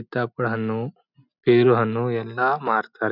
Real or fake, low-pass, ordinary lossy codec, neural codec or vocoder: real; 5.4 kHz; none; none